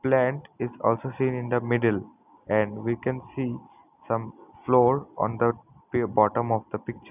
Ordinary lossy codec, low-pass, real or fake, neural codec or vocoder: none; 3.6 kHz; real; none